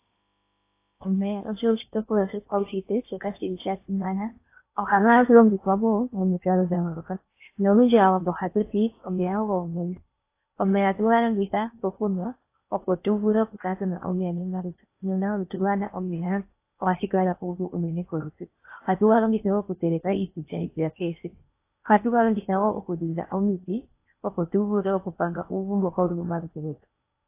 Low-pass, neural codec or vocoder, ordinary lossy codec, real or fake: 3.6 kHz; codec, 16 kHz in and 24 kHz out, 0.8 kbps, FocalCodec, streaming, 65536 codes; AAC, 24 kbps; fake